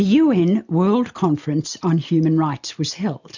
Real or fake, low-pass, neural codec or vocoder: real; 7.2 kHz; none